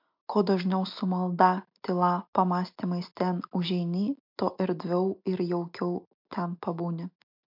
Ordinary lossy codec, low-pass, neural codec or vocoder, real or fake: MP3, 48 kbps; 5.4 kHz; none; real